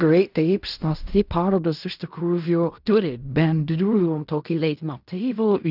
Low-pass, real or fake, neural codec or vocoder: 5.4 kHz; fake; codec, 16 kHz in and 24 kHz out, 0.4 kbps, LongCat-Audio-Codec, fine tuned four codebook decoder